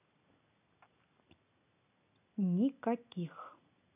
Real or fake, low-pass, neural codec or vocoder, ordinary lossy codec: real; 3.6 kHz; none; AAC, 32 kbps